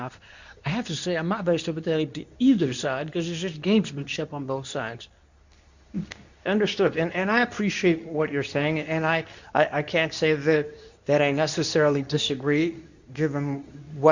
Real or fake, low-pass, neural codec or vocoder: fake; 7.2 kHz; codec, 24 kHz, 0.9 kbps, WavTokenizer, medium speech release version 2